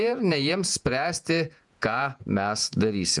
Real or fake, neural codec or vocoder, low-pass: fake; vocoder, 48 kHz, 128 mel bands, Vocos; 10.8 kHz